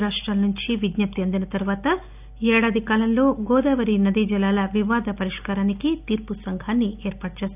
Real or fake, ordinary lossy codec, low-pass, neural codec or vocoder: real; none; 3.6 kHz; none